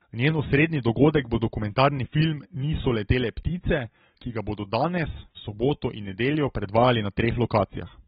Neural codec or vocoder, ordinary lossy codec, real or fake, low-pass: none; AAC, 16 kbps; real; 7.2 kHz